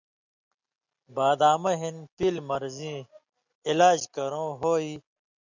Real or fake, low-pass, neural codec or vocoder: real; 7.2 kHz; none